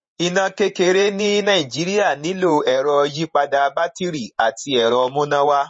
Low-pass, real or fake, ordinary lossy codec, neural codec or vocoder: 9.9 kHz; fake; MP3, 32 kbps; vocoder, 48 kHz, 128 mel bands, Vocos